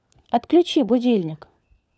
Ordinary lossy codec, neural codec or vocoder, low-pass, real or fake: none; codec, 16 kHz, 4 kbps, FunCodec, trained on LibriTTS, 50 frames a second; none; fake